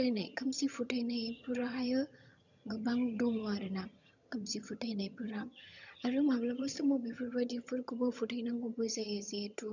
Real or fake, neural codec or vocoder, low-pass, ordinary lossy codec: fake; vocoder, 22.05 kHz, 80 mel bands, HiFi-GAN; 7.2 kHz; none